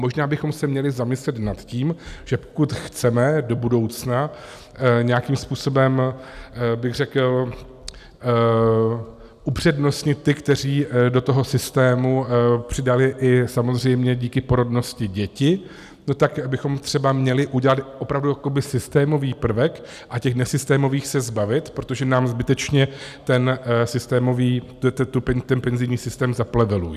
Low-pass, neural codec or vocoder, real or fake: 14.4 kHz; none; real